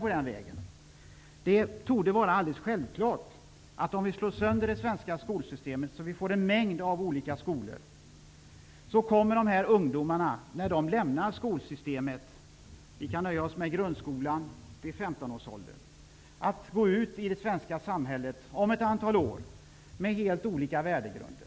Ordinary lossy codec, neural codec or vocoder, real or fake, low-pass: none; none; real; none